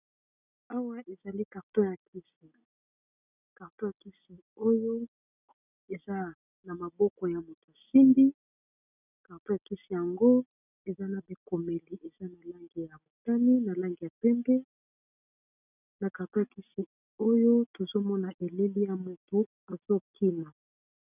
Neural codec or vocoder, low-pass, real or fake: none; 3.6 kHz; real